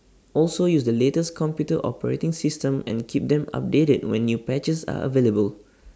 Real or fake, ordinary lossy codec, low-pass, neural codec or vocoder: real; none; none; none